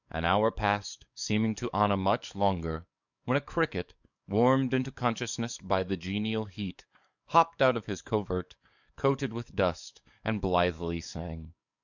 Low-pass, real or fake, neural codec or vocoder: 7.2 kHz; fake; codec, 44.1 kHz, 7.8 kbps, DAC